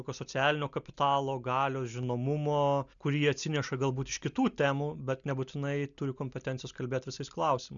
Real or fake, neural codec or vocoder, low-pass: real; none; 7.2 kHz